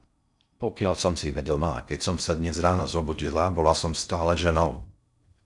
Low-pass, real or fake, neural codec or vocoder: 10.8 kHz; fake; codec, 16 kHz in and 24 kHz out, 0.6 kbps, FocalCodec, streaming, 4096 codes